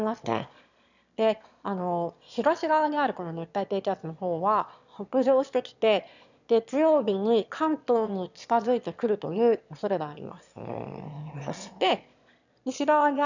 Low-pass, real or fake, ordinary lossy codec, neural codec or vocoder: 7.2 kHz; fake; none; autoencoder, 22.05 kHz, a latent of 192 numbers a frame, VITS, trained on one speaker